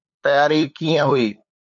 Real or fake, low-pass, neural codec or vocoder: fake; 7.2 kHz; codec, 16 kHz, 8 kbps, FunCodec, trained on LibriTTS, 25 frames a second